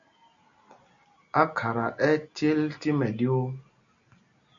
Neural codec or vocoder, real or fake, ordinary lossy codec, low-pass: none; real; MP3, 96 kbps; 7.2 kHz